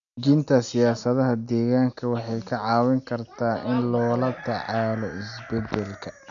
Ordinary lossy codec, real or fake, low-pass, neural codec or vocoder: none; real; 7.2 kHz; none